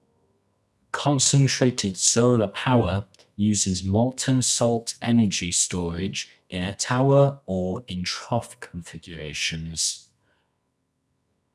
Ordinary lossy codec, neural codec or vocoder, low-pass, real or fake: none; codec, 24 kHz, 0.9 kbps, WavTokenizer, medium music audio release; none; fake